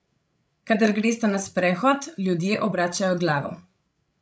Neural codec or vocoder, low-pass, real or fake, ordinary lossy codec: codec, 16 kHz, 16 kbps, FreqCodec, larger model; none; fake; none